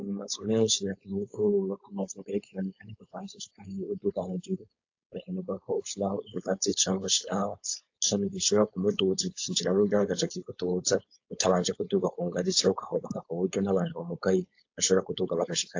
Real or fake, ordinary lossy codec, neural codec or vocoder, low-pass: fake; AAC, 48 kbps; codec, 16 kHz, 4.8 kbps, FACodec; 7.2 kHz